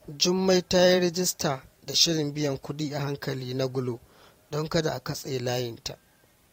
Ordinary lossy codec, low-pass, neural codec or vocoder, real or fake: AAC, 48 kbps; 19.8 kHz; vocoder, 44.1 kHz, 128 mel bands every 512 samples, BigVGAN v2; fake